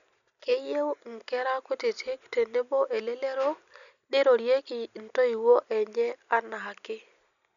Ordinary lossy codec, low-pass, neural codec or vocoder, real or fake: none; 7.2 kHz; none; real